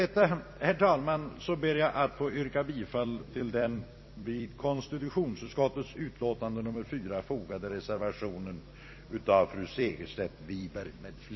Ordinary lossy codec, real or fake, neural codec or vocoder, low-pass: MP3, 24 kbps; fake; vocoder, 44.1 kHz, 128 mel bands every 256 samples, BigVGAN v2; 7.2 kHz